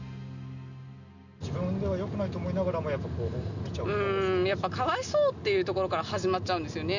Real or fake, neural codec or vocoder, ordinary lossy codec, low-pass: real; none; none; 7.2 kHz